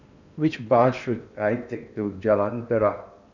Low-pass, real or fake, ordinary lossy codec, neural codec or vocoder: 7.2 kHz; fake; none; codec, 16 kHz in and 24 kHz out, 0.8 kbps, FocalCodec, streaming, 65536 codes